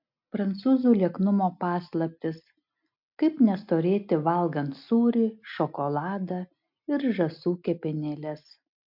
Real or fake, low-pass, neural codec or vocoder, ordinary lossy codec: real; 5.4 kHz; none; MP3, 48 kbps